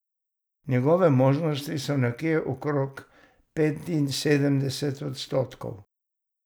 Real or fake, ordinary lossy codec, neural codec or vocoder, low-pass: real; none; none; none